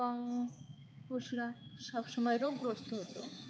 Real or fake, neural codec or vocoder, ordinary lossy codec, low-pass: fake; codec, 16 kHz, 4 kbps, X-Codec, HuBERT features, trained on balanced general audio; none; none